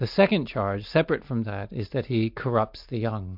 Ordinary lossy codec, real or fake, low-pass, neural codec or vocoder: MP3, 48 kbps; real; 5.4 kHz; none